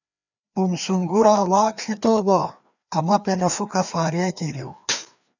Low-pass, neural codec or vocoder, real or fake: 7.2 kHz; codec, 16 kHz, 2 kbps, FreqCodec, larger model; fake